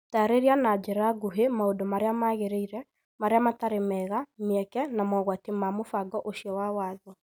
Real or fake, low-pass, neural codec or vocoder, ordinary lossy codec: real; none; none; none